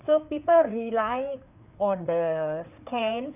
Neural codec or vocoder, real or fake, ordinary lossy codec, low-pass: codec, 16 kHz, 4 kbps, FreqCodec, larger model; fake; none; 3.6 kHz